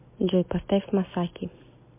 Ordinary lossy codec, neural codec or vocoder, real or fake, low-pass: MP3, 24 kbps; none; real; 3.6 kHz